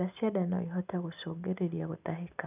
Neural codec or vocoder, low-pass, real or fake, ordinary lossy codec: none; 3.6 kHz; real; none